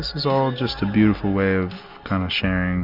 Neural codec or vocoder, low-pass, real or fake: none; 5.4 kHz; real